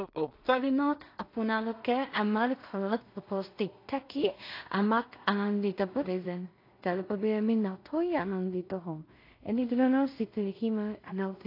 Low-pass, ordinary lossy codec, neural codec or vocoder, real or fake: 5.4 kHz; AAC, 32 kbps; codec, 16 kHz in and 24 kHz out, 0.4 kbps, LongCat-Audio-Codec, two codebook decoder; fake